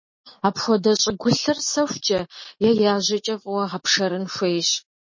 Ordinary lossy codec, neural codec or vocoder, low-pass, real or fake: MP3, 32 kbps; none; 7.2 kHz; real